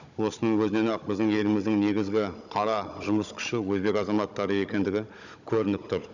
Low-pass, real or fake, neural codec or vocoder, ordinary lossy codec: 7.2 kHz; fake; codec, 16 kHz, 16 kbps, FunCodec, trained on Chinese and English, 50 frames a second; none